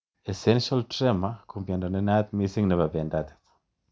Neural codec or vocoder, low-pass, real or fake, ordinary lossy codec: none; none; real; none